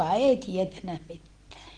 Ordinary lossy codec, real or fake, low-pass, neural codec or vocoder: Opus, 16 kbps; real; 9.9 kHz; none